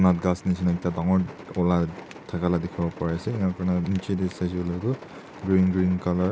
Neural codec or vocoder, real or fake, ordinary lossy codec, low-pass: none; real; none; none